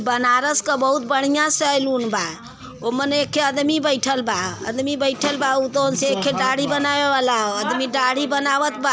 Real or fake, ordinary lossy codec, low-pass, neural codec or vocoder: real; none; none; none